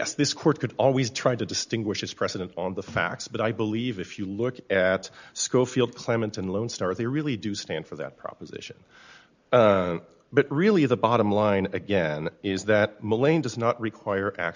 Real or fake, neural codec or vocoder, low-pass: real; none; 7.2 kHz